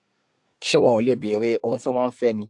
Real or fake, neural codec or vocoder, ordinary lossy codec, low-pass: fake; codec, 24 kHz, 1 kbps, SNAC; AAC, 64 kbps; 10.8 kHz